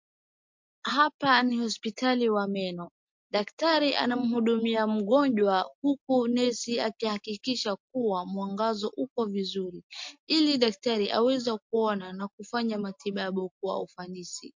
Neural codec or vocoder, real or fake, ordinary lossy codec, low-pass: none; real; MP3, 48 kbps; 7.2 kHz